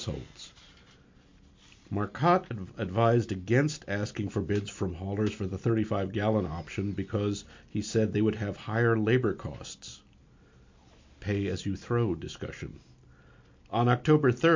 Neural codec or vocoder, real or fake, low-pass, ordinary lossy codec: none; real; 7.2 kHz; MP3, 48 kbps